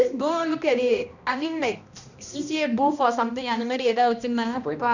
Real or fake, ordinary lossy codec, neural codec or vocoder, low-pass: fake; none; codec, 16 kHz, 1 kbps, X-Codec, HuBERT features, trained on balanced general audio; 7.2 kHz